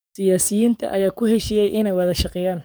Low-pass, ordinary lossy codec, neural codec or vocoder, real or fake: none; none; codec, 44.1 kHz, 7.8 kbps, DAC; fake